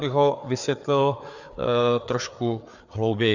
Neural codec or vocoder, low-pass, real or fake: codec, 16 kHz, 4 kbps, FreqCodec, larger model; 7.2 kHz; fake